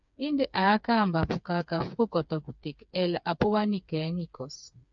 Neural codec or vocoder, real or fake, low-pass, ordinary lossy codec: codec, 16 kHz, 4 kbps, FreqCodec, smaller model; fake; 7.2 kHz; MP3, 64 kbps